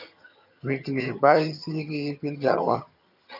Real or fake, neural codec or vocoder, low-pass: fake; vocoder, 22.05 kHz, 80 mel bands, HiFi-GAN; 5.4 kHz